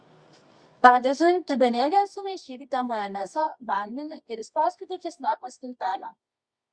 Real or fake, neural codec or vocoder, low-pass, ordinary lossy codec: fake; codec, 24 kHz, 0.9 kbps, WavTokenizer, medium music audio release; 9.9 kHz; Opus, 64 kbps